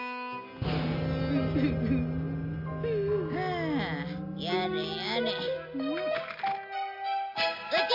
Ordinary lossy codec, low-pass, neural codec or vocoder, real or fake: MP3, 48 kbps; 5.4 kHz; none; real